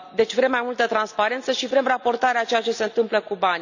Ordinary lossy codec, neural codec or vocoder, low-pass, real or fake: none; none; 7.2 kHz; real